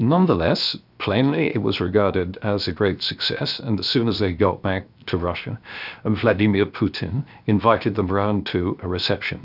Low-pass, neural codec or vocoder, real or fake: 5.4 kHz; codec, 16 kHz, 0.7 kbps, FocalCodec; fake